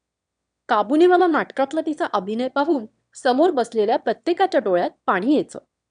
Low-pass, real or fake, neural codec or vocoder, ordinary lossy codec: 9.9 kHz; fake; autoencoder, 22.05 kHz, a latent of 192 numbers a frame, VITS, trained on one speaker; none